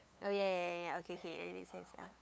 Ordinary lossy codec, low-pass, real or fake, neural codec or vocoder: none; none; fake; codec, 16 kHz, 8 kbps, FunCodec, trained on LibriTTS, 25 frames a second